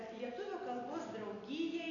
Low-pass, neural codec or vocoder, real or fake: 7.2 kHz; none; real